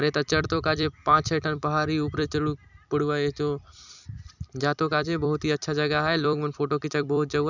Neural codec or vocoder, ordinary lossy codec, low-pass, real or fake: vocoder, 44.1 kHz, 128 mel bands every 256 samples, BigVGAN v2; none; 7.2 kHz; fake